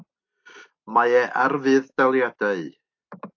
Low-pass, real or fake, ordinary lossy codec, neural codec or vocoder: 7.2 kHz; real; AAC, 48 kbps; none